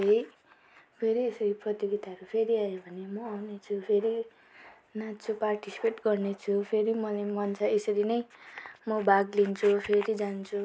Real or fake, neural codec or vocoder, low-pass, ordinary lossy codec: real; none; none; none